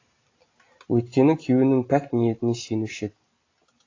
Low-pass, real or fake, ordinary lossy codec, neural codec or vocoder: 7.2 kHz; real; AAC, 32 kbps; none